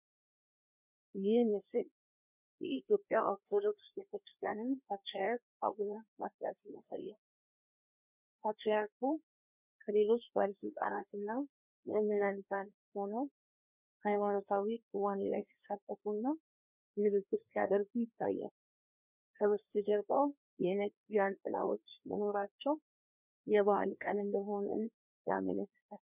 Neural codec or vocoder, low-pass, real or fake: codec, 16 kHz, 2 kbps, FreqCodec, larger model; 3.6 kHz; fake